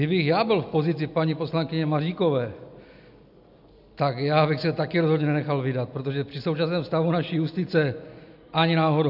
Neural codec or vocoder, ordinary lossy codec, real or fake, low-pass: none; AAC, 48 kbps; real; 5.4 kHz